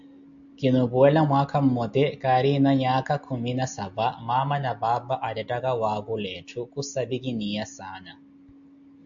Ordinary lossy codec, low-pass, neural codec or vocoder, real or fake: AAC, 64 kbps; 7.2 kHz; none; real